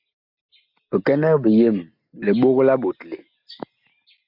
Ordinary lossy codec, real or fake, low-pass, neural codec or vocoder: MP3, 48 kbps; real; 5.4 kHz; none